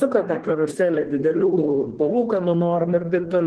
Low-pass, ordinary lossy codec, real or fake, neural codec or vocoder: 10.8 kHz; Opus, 16 kbps; fake; codec, 24 kHz, 1 kbps, SNAC